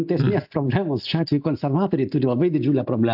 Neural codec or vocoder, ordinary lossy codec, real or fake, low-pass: none; AAC, 48 kbps; real; 5.4 kHz